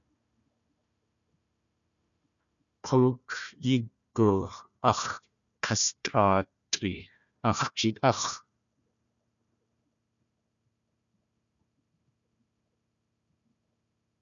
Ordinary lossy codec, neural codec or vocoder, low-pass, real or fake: MP3, 96 kbps; codec, 16 kHz, 1 kbps, FunCodec, trained on Chinese and English, 50 frames a second; 7.2 kHz; fake